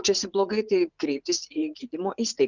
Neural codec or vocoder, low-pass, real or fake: vocoder, 44.1 kHz, 80 mel bands, Vocos; 7.2 kHz; fake